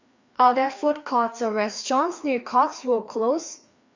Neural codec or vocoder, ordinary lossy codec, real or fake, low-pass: codec, 16 kHz, 2 kbps, FreqCodec, larger model; Opus, 64 kbps; fake; 7.2 kHz